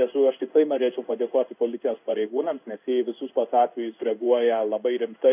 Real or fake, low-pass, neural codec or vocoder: fake; 3.6 kHz; codec, 16 kHz in and 24 kHz out, 1 kbps, XY-Tokenizer